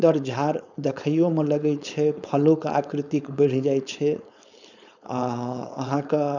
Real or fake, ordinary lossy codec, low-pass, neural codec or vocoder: fake; none; 7.2 kHz; codec, 16 kHz, 4.8 kbps, FACodec